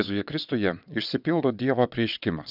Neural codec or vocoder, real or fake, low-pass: vocoder, 22.05 kHz, 80 mel bands, WaveNeXt; fake; 5.4 kHz